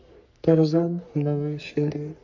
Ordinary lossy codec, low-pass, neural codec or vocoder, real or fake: none; 7.2 kHz; codec, 44.1 kHz, 3.4 kbps, Pupu-Codec; fake